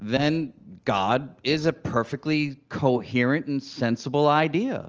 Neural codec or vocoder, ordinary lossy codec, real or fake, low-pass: none; Opus, 24 kbps; real; 7.2 kHz